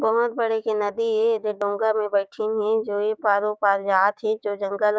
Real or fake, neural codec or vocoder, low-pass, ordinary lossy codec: fake; codec, 16 kHz, 6 kbps, DAC; none; none